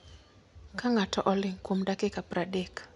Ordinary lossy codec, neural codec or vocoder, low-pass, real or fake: none; none; 10.8 kHz; real